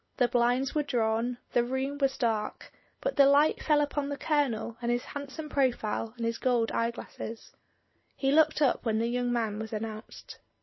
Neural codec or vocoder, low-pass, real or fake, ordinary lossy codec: none; 7.2 kHz; real; MP3, 24 kbps